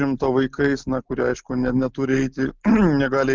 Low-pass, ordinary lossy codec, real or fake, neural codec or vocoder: 7.2 kHz; Opus, 24 kbps; real; none